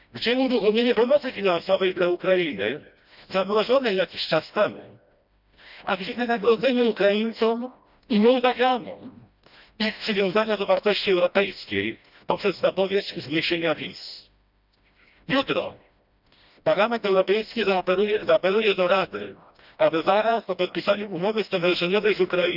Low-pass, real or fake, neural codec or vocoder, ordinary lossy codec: 5.4 kHz; fake; codec, 16 kHz, 1 kbps, FreqCodec, smaller model; none